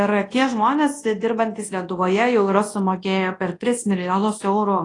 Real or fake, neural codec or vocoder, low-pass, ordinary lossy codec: fake; codec, 24 kHz, 0.9 kbps, WavTokenizer, large speech release; 10.8 kHz; AAC, 32 kbps